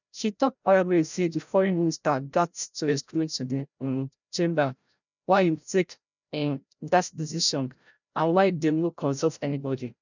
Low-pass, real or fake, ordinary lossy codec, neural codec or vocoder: 7.2 kHz; fake; none; codec, 16 kHz, 0.5 kbps, FreqCodec, larger model